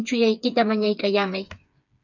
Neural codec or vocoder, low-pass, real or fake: codec, 16 kHz, 4 kbps, FreqCodec, smaller model; 7.2 kHz; fake